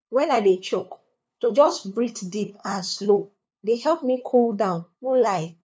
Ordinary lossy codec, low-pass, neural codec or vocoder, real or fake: none; none; codec, 16 kHz, 2 kbps, FunCodec, trained on LibriTTS, 25 frames a second; fake